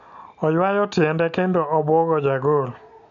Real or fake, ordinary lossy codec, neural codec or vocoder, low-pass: real; MP3, 96 kbps; none; 7.2 kHz